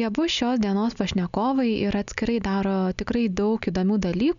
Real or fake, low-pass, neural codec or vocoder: real; 7.2 kHz; none